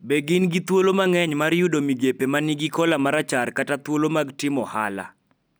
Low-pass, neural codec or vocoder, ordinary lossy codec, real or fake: none; none; none; real